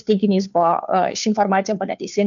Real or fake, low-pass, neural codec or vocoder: fake; 7.2 kHz; codec, 16 kHz, 2 kbps, FunCodec, trained on Chinese and English, 25 frames a second